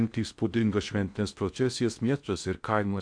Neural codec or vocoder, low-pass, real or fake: codec, 16 kHz in and 24 kHz out, 0.6 kbps, FocalCodec, streaming, 2048 codes; 9.9 kHz; fake